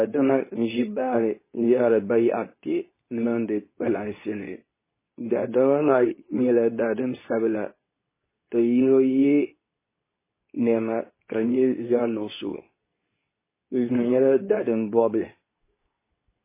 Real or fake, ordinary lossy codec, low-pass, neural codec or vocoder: fake; MP3, 16 kbps; 3.6 kHz; codec, 24 kHz, 0.9 kbps, WavTokenizer, medium speech release version 2